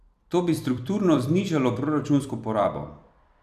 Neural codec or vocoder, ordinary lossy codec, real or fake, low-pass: vocoder, 44.1 kHz, 128 mel bands every 256 samples, BigVGAN v2; none; fake; 14.4 kHz